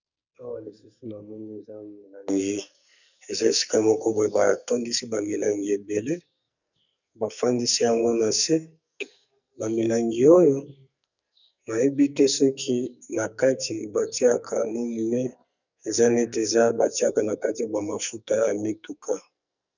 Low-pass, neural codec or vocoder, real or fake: 7.2 kHz; codec, 44.1 kHz, 2.6 kbps, SNAC; fake